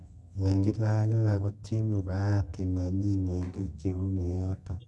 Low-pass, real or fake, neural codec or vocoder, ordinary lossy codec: none; fake; codec, 24 kHz, 0.9 kbps, WavTokenizer, medium music audio release; none